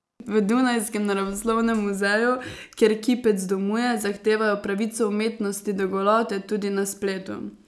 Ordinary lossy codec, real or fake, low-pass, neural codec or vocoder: none; real; none; none